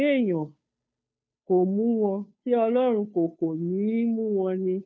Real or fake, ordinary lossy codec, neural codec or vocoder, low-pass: fake; none; codec, 16 kHz, 2 kbps, FunCodec, trained on Chinese and English, 25 frames a second; none